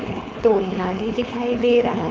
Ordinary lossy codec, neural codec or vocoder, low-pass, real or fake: none; codec, 16 kHz, 4.8 kbps, FACodec; none; fake